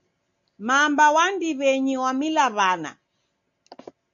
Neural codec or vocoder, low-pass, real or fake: none; 7.2 kHz; real